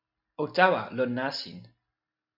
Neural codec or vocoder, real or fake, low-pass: none; real; 5.4 kHz